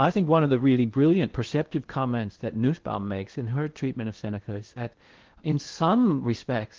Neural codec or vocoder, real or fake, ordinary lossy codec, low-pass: codec, 16 kHz in and 24 kHz out, 0.8 kbps, FocalCodec, streaming, 65536 codes; fake; Opus, 16 kbps; 7.2 kHz